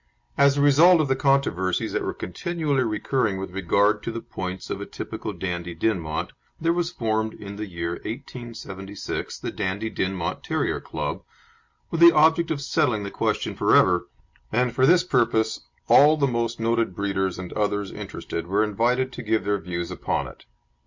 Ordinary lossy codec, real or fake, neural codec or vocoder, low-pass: MP3, 48 kbps; real; none; 7.2 kHz